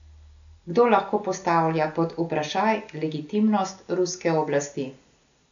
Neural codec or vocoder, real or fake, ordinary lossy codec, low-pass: none; real; none; 7.2 kHz